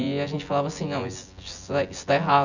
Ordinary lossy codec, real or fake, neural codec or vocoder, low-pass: none; fake; vocoder, 24 kHz, 100 mel bands, Vocos; 7.2 kHz